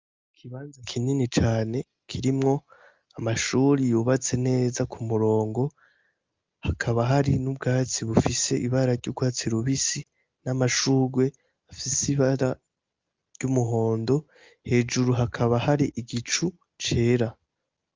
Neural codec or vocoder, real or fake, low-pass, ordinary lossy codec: none; real; 7.2 kHz; Opus, 24 kbps